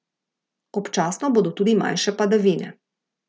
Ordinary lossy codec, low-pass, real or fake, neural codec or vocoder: none; none; real; none